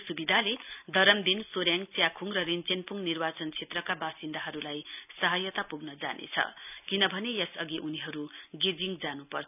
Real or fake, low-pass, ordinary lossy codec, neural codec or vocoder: real; 3.6 kHz; none; none